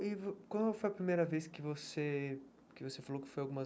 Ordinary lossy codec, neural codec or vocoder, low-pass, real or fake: none; none; none; real